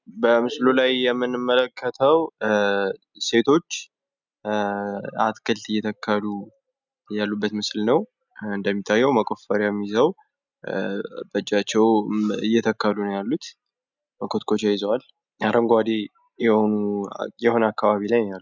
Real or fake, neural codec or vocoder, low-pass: real; none; 7.2 kHz